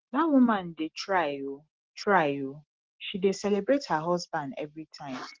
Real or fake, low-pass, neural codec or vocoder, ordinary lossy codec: real; 7.2 kHz; none; Opus, 16 kbps